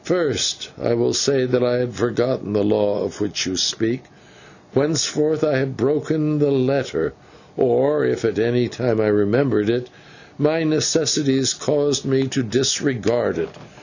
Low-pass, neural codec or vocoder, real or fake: 7.2 kHz; none; real